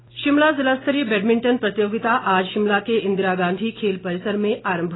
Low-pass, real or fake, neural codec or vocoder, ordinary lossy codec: 7.2 kHz; real; none; AAC, 16 kbps